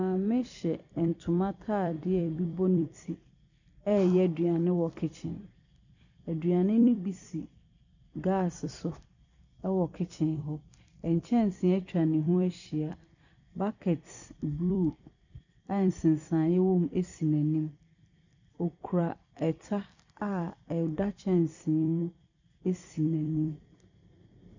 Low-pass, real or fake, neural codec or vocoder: 7.2 kHz; fake; vocoder, 44.1 kHz, 128 mel bands every 256 samples, BigVGAN v2